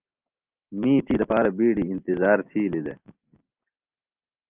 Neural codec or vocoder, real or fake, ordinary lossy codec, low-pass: none; real; Opus, 32 kbps; 3.6 kHz